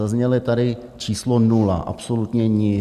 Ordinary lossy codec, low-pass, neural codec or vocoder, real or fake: Opus, 64 kbps; 14.4 kHz; none; real